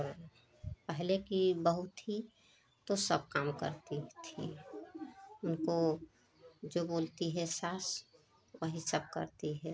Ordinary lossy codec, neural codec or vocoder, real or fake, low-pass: none; none; real; none